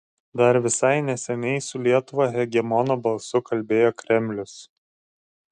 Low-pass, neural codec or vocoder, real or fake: 9.9 kHz; none; real